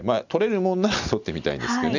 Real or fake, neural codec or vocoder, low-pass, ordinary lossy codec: real; none; 7.2 kHz; none